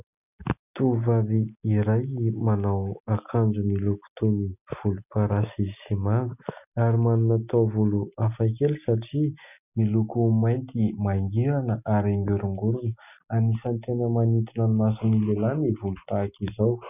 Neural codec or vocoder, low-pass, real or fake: none; 3.6 kHz; real